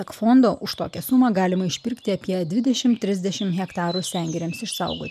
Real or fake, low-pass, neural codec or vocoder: real; 14.4 kHz; none